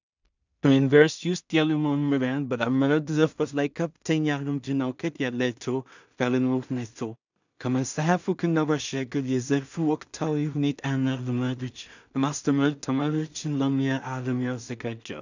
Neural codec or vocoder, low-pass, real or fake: codec, 16 kHz in and 24 kHz out, 0.4 kbps, LongCat-Audio-Codec, two codebook decoder; 7.2 kHz; fake